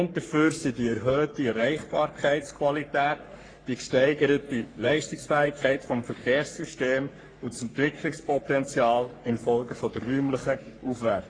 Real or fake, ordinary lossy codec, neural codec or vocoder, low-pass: fake; AAC, 32 kbps; codec, 44.1 kHz, 3.4 kbps, Pupu-Codec; 9.9 kHz